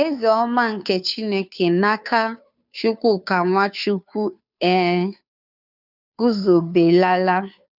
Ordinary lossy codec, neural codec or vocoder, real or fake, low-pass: none; codec, 16 kHz, 2 kbps, FunCodec, trained on Chinese and English, 25 frames a second; fake; 5.4 kHz